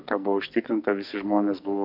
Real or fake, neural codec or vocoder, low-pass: fake; codec, 44.1 kHz, 2.6 kbps, SNAC; 5.4 kHz